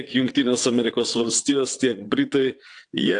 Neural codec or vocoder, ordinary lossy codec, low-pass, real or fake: vocoder, 22.05 kHz, 80 mel bands, WaveNeXt; AAC, 64 kbps; 9.9 kHz; fake